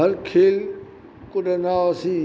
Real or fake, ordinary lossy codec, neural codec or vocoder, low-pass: real; none; none; none